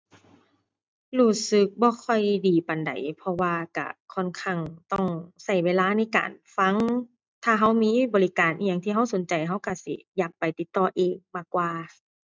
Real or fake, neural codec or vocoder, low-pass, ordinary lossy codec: real; none; none; none